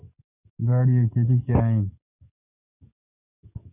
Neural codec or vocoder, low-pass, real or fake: codec, 44.1 kHz, 7.8 kbps, DAC; 3.6 kHz; fake